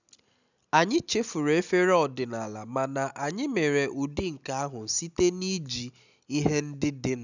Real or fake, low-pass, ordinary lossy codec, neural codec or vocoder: real; 7.2 kHz; none; none